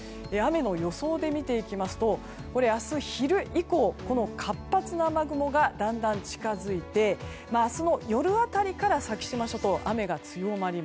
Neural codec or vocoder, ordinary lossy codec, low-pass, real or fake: none; none; none; real